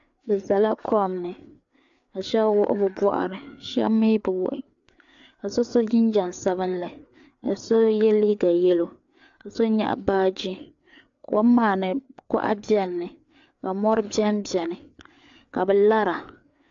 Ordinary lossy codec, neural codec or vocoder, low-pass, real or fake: AAC, 64 kbps; codec, 16 kHz, 4 kbps, FreqCodec, larger model; 7.2 kHz; fake